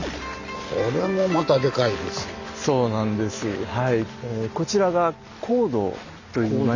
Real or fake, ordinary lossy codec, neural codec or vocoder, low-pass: real; none; none; 7.2 kHz